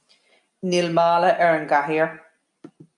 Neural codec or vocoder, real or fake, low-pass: none; real; 10.8 kHz